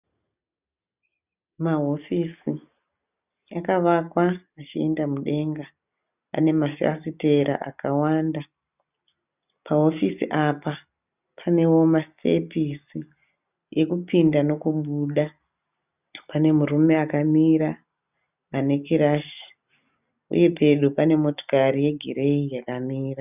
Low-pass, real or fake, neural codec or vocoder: 3.6 kHz; real; none